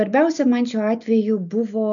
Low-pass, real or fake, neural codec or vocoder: 7.2 kHz; real; none